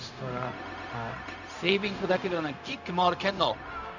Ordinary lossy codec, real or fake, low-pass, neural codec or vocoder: none; fake; 7.2 kHz; codec, 16 kHz, 0.4 kbps, LongCat-Audio-Codec